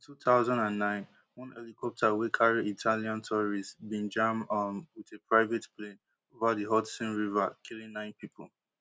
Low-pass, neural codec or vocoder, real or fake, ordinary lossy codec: none; none; real; none